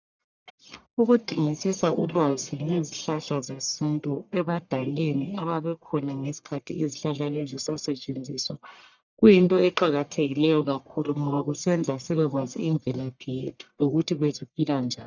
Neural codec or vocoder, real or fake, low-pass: codec, 44.1 kHz, 1.7 kbps, Pupu-Codec; fake; 7.2 kHz